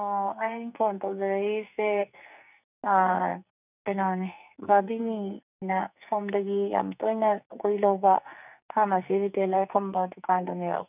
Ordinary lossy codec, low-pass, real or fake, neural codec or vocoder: none; 3.6 kHz; fake; codec, 44.1 kHz, 2.6 kbps, SNAC